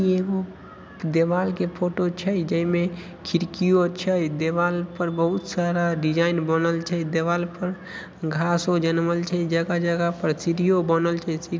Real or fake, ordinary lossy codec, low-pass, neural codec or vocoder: real; none; none; none